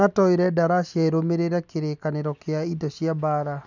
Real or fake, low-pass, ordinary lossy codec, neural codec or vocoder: real; 7.2 kHz; none; none